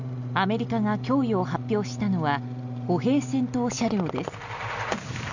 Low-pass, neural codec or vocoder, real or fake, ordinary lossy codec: 7.2 kHz; none; real; none